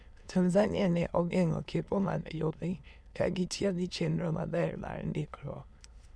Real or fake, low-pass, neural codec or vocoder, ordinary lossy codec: fake; none; autoencoder, 22.05 kHz, a latent of 192 numbers a frame, VITS, trained on many speakers; none